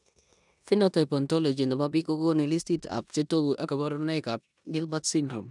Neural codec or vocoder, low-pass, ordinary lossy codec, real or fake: codec, 16 kHz in and 24 kHz out, 0.9 kbps, LongCat-Audio-Codec, four codebook decoder; 10.8 kHz; none; fake